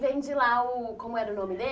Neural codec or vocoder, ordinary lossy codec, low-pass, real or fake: none; none; none; real